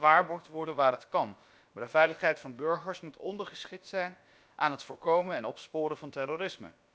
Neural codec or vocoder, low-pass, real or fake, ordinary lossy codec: codec, 16 kHz, about 1 kbps, DyCAST, with the encoder's durations; none; fake; none